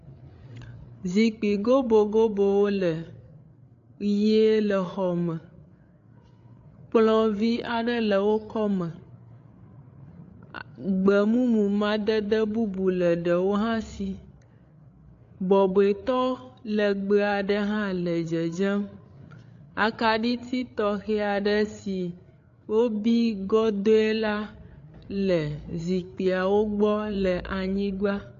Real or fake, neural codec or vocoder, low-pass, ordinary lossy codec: fake; codec, 16 kHz, 8 kbps, FreqCodec, larger model; 7.2 kHz; AAC, 48 kbps